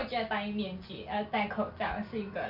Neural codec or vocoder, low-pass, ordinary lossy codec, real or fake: none; 5.4 kHz; Opus, 64 kbps; real